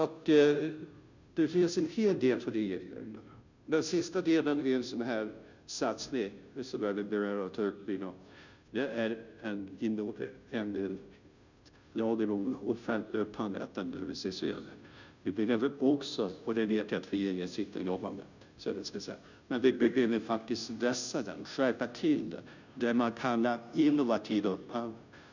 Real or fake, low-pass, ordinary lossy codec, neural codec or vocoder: fake; 7.2 kHz; none; codec, 16 kHz, 0.5 kbps, FunCodec, trained on Chinese and English, 25 frames a second